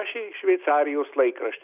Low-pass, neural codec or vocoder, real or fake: 3.6 kHz; none; real